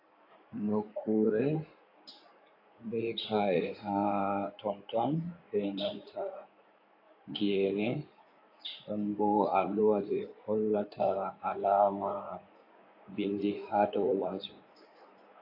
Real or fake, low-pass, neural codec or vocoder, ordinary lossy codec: fake; 5.4 kHz; codec, 16 kHz in and 24 kHz out, 2.2 kbps, FireRedTTS-2 codec; AAC, 32 kbps